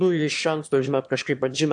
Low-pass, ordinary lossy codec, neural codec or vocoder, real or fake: 9.9 kHz; AAC, 64 kbps; autoencoder, 22.05 kHz, a latent of 192 numbers a frame, VITS, trained on one speaker; fake